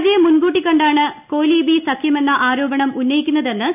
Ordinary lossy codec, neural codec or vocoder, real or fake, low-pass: none; none; real; 3.6 kHz